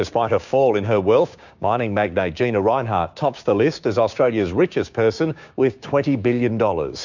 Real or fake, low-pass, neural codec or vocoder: fake; 7.2 kHz; codec, 16 kHz, 6 kbps, DAC